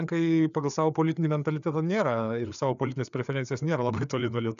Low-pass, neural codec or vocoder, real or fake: 7.2 kHz; codec, 16 kHz, 4 kbps, FreqCodec, larger model; fake